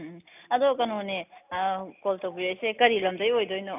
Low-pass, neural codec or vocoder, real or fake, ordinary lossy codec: 3.6 kHz; none; real; none